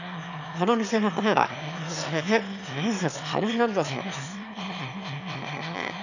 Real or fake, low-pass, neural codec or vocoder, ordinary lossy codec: fake; 7.2 kHz; autoencoder, 22.05 kHz, a latent of 192 numbers a frame, VITS, trained on one speaker; none